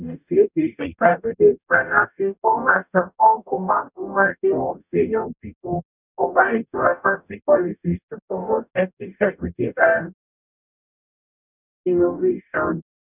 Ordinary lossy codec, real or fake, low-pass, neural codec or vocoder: none; fake; 3.6 kHz; codec, 44.1 kHz, 0.9 kbps, DAC